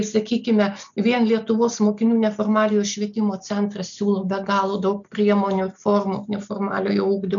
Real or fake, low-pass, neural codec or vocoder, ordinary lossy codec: real; 7.2 kHz; none; MP3, 96 kbps